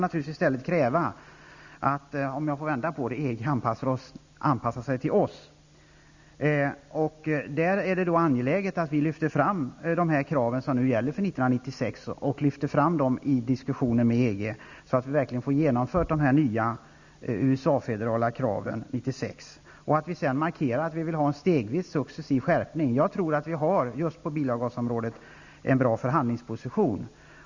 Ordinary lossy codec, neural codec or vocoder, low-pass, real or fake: Opus, 64 kbps; none; 7.2 kHz; real